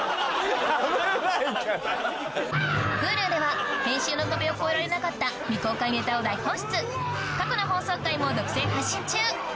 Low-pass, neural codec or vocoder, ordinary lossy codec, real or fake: none; none; none; real